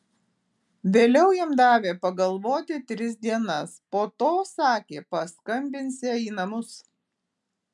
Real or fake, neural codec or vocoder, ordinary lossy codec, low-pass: real; none; MP3, 96 kbps; 10.8 kHz